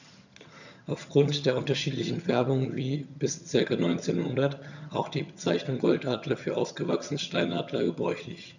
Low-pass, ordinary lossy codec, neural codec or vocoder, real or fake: 7.2 kHz; none; vocoder, 22.05 kHz, 80 mel bands, HiFi-GAN; fake